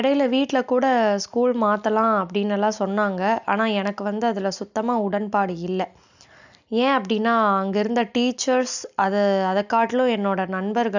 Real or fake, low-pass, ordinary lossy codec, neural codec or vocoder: real; 7.2 kHz; none; none